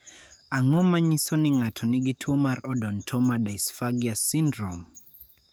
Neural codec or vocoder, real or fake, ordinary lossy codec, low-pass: codec, 44.1 kHz, 7.8 kbps, DAC; fake; none; none